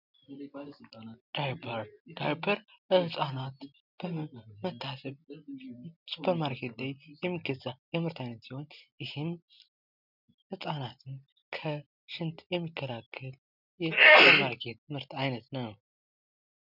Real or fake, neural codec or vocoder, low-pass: real; none; 5.4 kHz